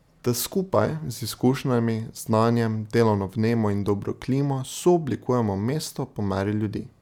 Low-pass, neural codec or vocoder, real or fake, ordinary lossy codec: 19.8 kHz; none; real; none